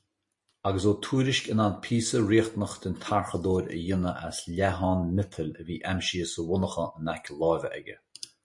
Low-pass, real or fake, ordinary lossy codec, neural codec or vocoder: 10.8 kHz; real; MP3, 48 kbps; none